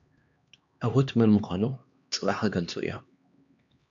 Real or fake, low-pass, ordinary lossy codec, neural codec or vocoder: fake; 7.2 kHz; MP3, 64 kbps; codec, 16 kHz, 2 kbps, X-Codec, HuBERT features, trained on LibriSpeech